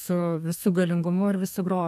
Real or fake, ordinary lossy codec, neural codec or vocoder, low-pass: fake; AAC, 96 kbps; codec, 32 kHz, 1.9 kbps, SNAC; 14.4 kHz